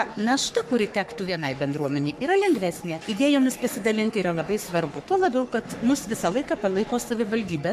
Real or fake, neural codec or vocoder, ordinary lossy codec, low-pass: fake; codec, 44.1 kHz, 3.4 kbps, Pupu-Codec; MP3, 96 kbps; 14.4 kHz